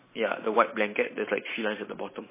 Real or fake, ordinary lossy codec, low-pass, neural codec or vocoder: fake; MP3, 16 kbps; 3.6 kHz; vocoder, 44.1 kHz, 128 mel bands every 512 samples, BigVGAN v2